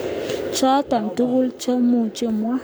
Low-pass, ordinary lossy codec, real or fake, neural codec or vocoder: none; none; fake; codec, 44.1 kHz, 7.8 kbps, Pupu-Codec